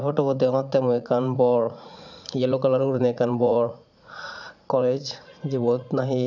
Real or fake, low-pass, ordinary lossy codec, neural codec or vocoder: fake; 7.2 kHz; none; vocoder, 44.1 kHz, 80 mel bands, Vocos